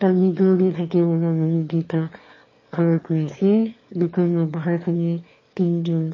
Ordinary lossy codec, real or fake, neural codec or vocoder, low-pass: MP3, 32 kbps; fake; autoencoder, 22.05 kHz, a latent of 192 numbers a frame, VITS, trained on one speaker; 7.2 kHz